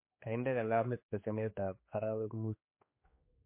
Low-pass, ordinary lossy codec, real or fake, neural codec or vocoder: 3.6 kHz; MP3, 24 kbps; fake; codec, 16 kHz, 2 kbps, X-Codec, HuBERT features, trained on general audio